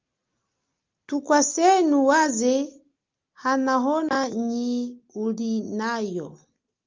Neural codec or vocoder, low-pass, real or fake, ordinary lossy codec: none; 7.2 kHz; real; Opus, 32 kbps